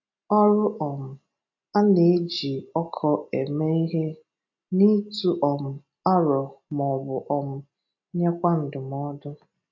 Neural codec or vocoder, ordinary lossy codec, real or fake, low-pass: none; AAC, 48 kbps; real; 7.2 kHz